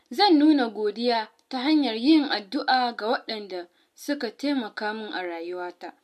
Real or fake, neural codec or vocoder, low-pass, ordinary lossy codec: real; none; 14.4 kHz; MP3, 64 kbps